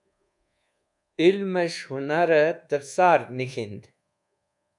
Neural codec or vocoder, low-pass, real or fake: codec, 24 kHz, 1.2 kbps, DualCodec; 10.8 kHz; fake